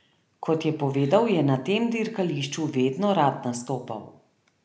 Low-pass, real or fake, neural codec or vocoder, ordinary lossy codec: none; real; none; none